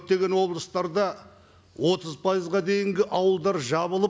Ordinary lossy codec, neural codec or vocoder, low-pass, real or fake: none; none; none; real